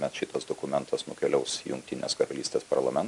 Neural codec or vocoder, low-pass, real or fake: vocoder, 44.1 kHz, 128 mel bands every 256 samples, BigVGAN v2; 10.8 kHz; fake